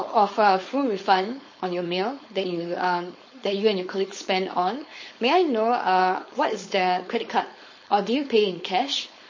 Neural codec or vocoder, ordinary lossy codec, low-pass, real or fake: codec, 16 kHz, 4.8 kbps, FACodec; MP3, 32 kbps; 7.2 kHz; fake